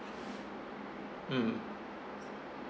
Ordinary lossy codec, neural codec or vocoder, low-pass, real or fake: none; none; none; real